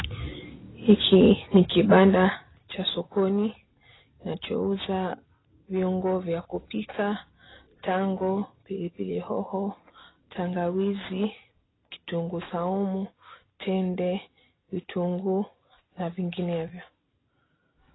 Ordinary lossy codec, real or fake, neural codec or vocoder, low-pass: AAC, 16 kbps; real; none; 7.2 kHz